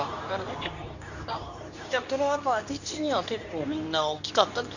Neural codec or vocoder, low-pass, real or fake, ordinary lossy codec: codec, 24 kHz, 0.9 kbps, WavTokenizer, medium speech release version 2; 7.2 kHz; fake; none